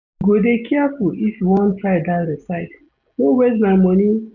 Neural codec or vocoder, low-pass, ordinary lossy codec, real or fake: none; 7.2 kHz; none; real